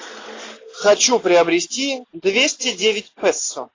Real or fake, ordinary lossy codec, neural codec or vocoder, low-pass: real; AAC, 32 kbps; none; 7.2 kHz